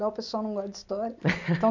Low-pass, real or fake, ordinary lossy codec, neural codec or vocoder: 7.2 kHz; real; none; none